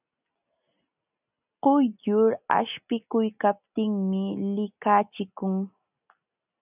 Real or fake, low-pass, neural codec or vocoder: real; 3.6 kHz; none